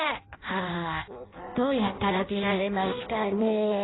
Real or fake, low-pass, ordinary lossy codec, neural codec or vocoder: fake; 7.2 kHz; AAC, 16 kbps; codec, 16 kHz in and 24 kHz out, 0.6 kbps, FireRedTTS-2 codec